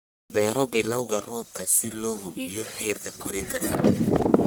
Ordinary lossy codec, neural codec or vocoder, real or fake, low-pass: none; codec, 44.1 kHz, 1.7 kbps, Pupu-Codec; fake; none